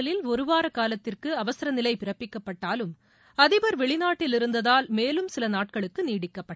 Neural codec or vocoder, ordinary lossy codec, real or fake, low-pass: none; none; real; none